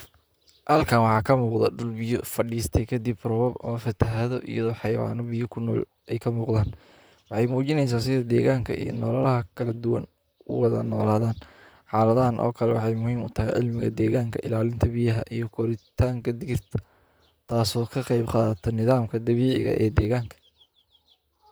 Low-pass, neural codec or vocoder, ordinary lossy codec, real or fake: none; vocoder, 44.1 kHz, 128 mel bands, Pupu-Vocoder; none; fake